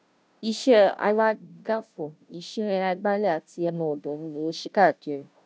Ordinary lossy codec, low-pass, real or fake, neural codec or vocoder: none; none; fake; codec, 16 kHz, 0.5 kbps, FunCodec, trained on Chinese and English, 25 frames a second